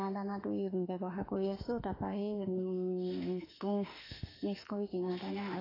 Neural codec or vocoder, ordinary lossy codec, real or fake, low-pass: autoencoder, 48 kHz, 32 numbers a frame, DAC-VAE, trained on Japanese speech; AAC, 24 kbps; fake; 5.4 kHz